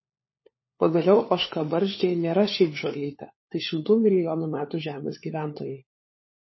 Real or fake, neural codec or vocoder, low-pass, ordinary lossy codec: fake; codec, 16 kHz, 4 kbps, FunCodec, trained on LibriTTS, 50 frames a second; 7.2 kHz; MP3, 24 kbps